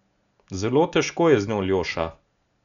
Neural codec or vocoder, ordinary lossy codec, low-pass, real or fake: none; none; 7.2 kHz; real